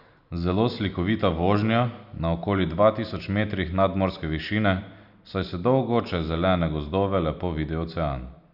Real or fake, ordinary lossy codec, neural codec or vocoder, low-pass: real; none; none; 5.4 kHz